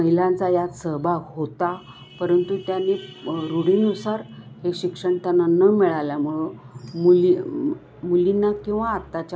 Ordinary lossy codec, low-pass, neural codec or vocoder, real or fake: none; none; none; real